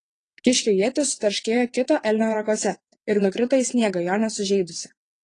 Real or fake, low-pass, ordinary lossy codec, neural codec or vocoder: fake; 9.9 kHz; AAC, 32 kbps; vocoder, 22.05 kHz, 80 mel bands, WaveNeXt